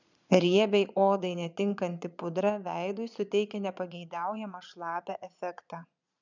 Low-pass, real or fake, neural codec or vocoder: 7.2 kHz; fake; vocoder, 22.05 kHz, 80 mel bands, Vocos